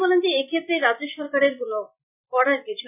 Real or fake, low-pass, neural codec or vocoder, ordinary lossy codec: real; 3.6 kHz; none; none